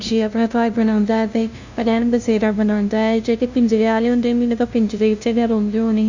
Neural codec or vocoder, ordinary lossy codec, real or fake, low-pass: codec, 16 kHz, 0.5 kbps, FunCodec, trained on LibriTTS, 25 frames a second; Opus, 64 kbps; fake; 7.2 kHz